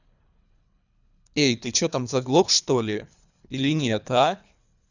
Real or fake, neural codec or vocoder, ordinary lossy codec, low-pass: fake; codec, 24 kHz, 3 kbps, HILCodec; none; 7.2 kHz